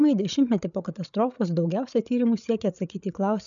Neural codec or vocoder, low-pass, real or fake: codec, 16 kHz, 8 kbps, FreqCodec, larger model; 7.2 kHz; fake